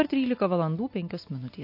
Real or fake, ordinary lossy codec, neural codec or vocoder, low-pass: real; MP3, 32 kbps; none; 5.4 kHz